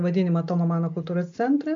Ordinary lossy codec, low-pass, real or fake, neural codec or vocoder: AAC, 64 kbps; 7.2 kHz; real; none